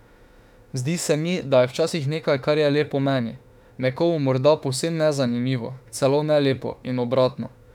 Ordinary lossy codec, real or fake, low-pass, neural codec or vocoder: none; fake; 19.8 kHz; autoencoder, 48 kHz, 32 numbers a frame, DAC-VAE, trained on Japanese speech